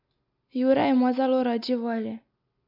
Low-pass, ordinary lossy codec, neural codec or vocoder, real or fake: 5.4 kHz; AAC, 48 kbps; none; real